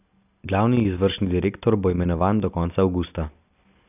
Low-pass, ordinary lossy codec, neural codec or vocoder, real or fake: 3.6 kHz; none; none; real